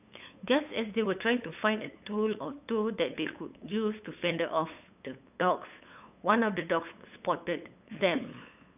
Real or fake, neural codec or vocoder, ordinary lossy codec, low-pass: fake; codec, 16 kHz, 8 kbps, FunCodec, trained on LibriTTS, 25 frames a second; none; 3.6 kHz